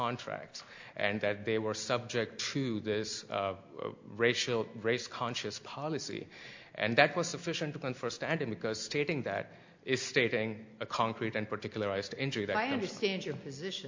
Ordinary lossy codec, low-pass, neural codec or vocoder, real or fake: MP3, 48 kbps; 7.2 kHz; none; real